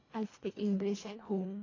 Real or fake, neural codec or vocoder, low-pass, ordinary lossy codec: fake; codec, 24 kHz, 1.5 kbps, HILCodec; 7.2 kHz; AAC, 32 kbps